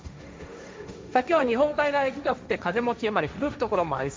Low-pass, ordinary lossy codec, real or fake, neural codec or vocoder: none; none; fake; codec, 16 kHz, 1.1 kbps, Voila-Tokenizer